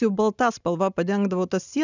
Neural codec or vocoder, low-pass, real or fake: none; 7.2 kHz; real